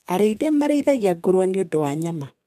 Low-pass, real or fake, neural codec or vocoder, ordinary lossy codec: 14.4 kHz; fake; codec, 32 kHz, 1.9 kbps, SNAC; MP3, 96 kbps